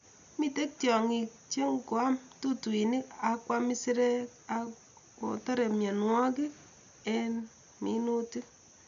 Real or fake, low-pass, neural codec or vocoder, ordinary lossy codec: real; 7.2 kHz; none; none